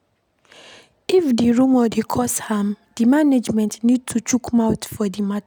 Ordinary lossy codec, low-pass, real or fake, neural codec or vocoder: none; none; real; none